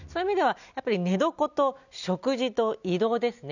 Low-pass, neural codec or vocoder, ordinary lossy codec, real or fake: 7.2 kHz; none; none; real